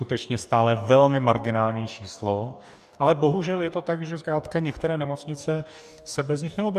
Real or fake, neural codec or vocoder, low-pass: fake; codec, 44.1 kHz, 2.6 kbps, DAC; 14.4 kHz